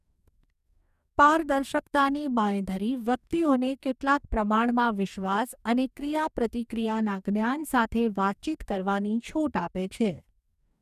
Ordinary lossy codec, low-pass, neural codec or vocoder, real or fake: none; 14.4 kHz; codec, 44.1 kHz, 2.6 kbps, DAC; fake